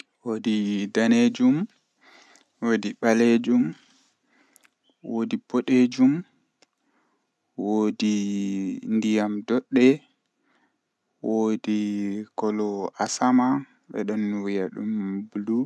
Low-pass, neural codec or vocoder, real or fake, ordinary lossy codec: none; none; real; none